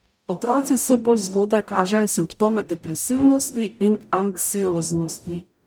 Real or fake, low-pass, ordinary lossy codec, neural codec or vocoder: fake; none; none; codec, 44.1 kHz, 0.9 kbps, DAC